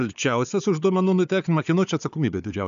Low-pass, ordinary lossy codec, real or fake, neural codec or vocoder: 7.2 kHz; AAC, 96 kbps; fake; codec, 16 kHz, 4 kbps, FunCodec, trained on Chinese and English, 50 frames a second